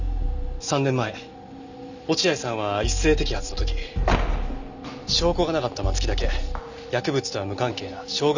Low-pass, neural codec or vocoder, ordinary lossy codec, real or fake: 7.2 kHz; none; none; real